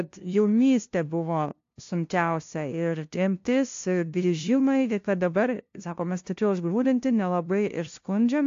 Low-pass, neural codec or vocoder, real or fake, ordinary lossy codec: 7.2 kHz; codec, 16 kHz, 0.5 kbps, FunCodec, trained on LibriTTS, 25 frames a second; fake; AAC, 48 kbps